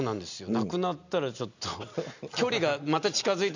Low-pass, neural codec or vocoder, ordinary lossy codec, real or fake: 7.2 kHz; none; none; real